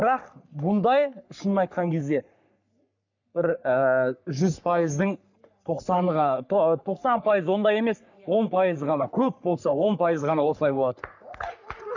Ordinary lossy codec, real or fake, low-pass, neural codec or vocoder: none; fake; 7.2 kHz; codec, 44.1 kHz, 3.4 kbps, Pupu-Codec